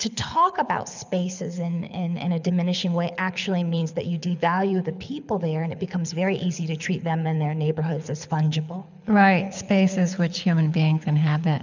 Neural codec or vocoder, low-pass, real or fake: codec, 24 kHz, 6 kbps, HILCodec; 7.2 kHz; fake